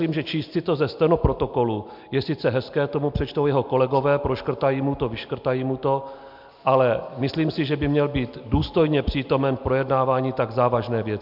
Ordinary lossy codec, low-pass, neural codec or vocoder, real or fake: MP3, 48 kbps; 5.4 kHz; none; real